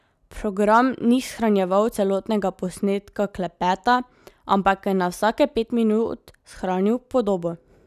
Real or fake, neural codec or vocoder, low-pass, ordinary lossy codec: real; none; 14.4 kHz; none